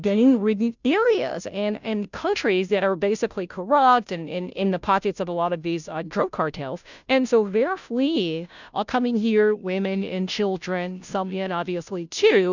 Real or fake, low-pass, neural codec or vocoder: fake; 7.2 kHz; codec, 16 kHz, 0.5 kbps, FunCodec, trained on Chinese and English, 25 frames a second